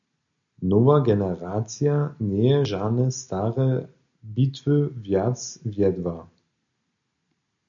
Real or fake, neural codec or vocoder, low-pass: real; none; 7.2 kHz